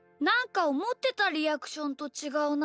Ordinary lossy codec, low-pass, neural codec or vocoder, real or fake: none; none; none; real